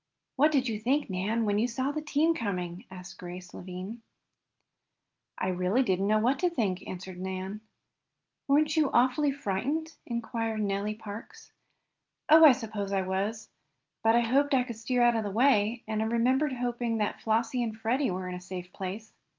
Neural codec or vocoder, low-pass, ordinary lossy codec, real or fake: none; 7.2 kHz; Opus, 32 kbps; real